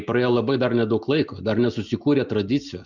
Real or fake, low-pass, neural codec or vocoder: real; 7.2 kHz; none